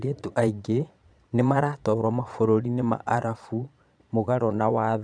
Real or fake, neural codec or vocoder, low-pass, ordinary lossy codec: fake; vocoder, 22.05 kHz, 80 mel bands, Vocos; 9.9 kHz; none